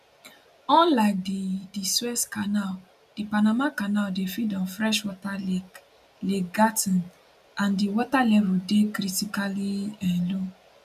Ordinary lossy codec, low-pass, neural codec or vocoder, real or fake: none; 14.4 kHz; none; real